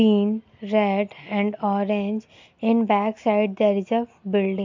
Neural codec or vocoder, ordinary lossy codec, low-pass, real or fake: none; MP3, 48 kbps; 7.2 kHz; real